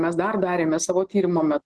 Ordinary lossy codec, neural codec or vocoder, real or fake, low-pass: Opus, 16 kbps; none; real; 10.8 kHz